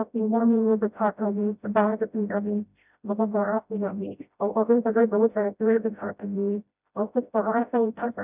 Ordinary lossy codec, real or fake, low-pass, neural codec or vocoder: none; fake; 3.6 kHz; codec, 16 kHz, 0.5 kbps, FreqCodec, smaller model